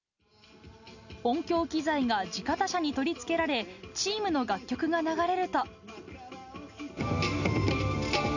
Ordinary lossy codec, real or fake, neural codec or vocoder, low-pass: none; real; none; 7.2 kHz